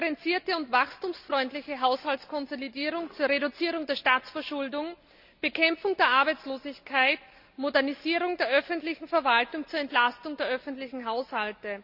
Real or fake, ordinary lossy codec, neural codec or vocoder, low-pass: real; none; none; 5.4 kHz